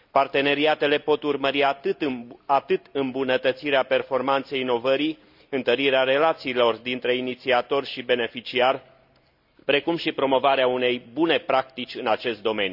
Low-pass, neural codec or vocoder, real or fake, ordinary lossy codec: 5.4 kHz; none; real; none